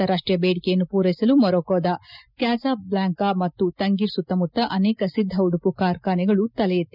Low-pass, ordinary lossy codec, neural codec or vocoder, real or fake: 5.4 kHz; none; none; real